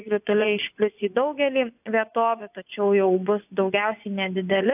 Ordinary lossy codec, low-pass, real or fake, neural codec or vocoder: AAC, 32 kbps; 3.6 kHz; fake; vocoder, 24 kHz, 100 mel bands, Vocos